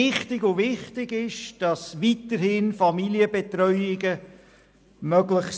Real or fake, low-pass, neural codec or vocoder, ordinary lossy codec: real; none; none; none